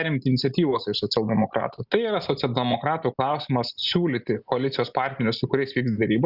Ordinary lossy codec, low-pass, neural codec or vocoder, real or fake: Opus, 64 kbps; 5.4 kHz; none; real